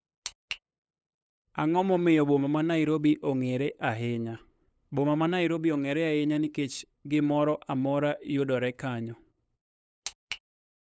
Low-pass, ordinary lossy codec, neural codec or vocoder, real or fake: none; none; codec, 16 kHz, 8 kbps, FunCodec, trained on LibriTTS, 25 frames a second; fake